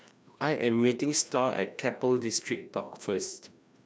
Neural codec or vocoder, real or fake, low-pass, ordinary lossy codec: codec, 16 kHz, 1 kbps, FreqCodec, larger model; fake; none; none